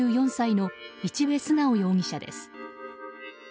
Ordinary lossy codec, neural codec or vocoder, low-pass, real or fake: none; none; none; real